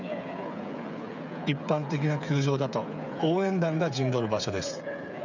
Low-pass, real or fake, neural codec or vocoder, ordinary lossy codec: 7.2 kHz; fake; codec, 16 kHz, 8 kbps, FreqCodec, smaller model; none